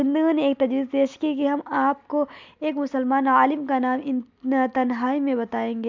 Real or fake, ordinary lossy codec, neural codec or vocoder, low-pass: real; MP3, 64 kbps; none; 7.2 kHz